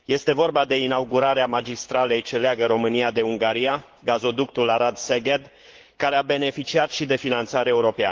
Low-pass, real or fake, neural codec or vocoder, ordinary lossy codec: 7.2 kHz; fake; codec, 16 kHz, 6 kbps, DAC; Opus, 16 kbps